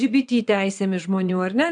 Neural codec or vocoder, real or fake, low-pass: vocoder, 22.05 kHz, 80 mel bands, WaveNeXt; fake; 9.9 kHz